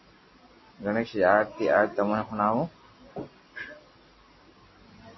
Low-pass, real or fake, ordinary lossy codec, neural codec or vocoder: 7.2 kHz; real; MP3, 24 kbps; none